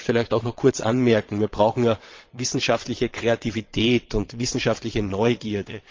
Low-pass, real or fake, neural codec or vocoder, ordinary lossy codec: 7.2 kHz; fake; vocoder, 22.05 kHz, 80 mel bands, WaveNeXt; Opus, 32 kbps